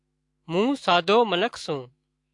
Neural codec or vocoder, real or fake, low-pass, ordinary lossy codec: autoencoder, 48 kHz, 128 numbers a frame, DAC-VAE, trained on Japanese speech; fake; 10.8 kHz; AAC, 64 kbps